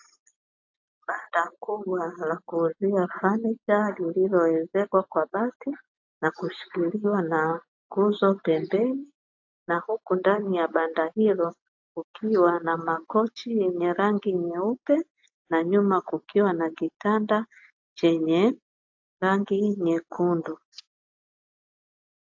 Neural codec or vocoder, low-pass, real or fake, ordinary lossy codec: none; 7.2 kHz; real; AAC, 48 kbps